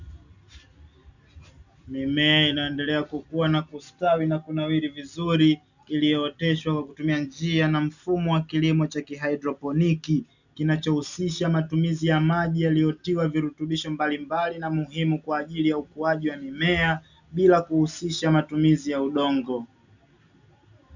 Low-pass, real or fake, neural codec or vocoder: 7.2 kHz; real; none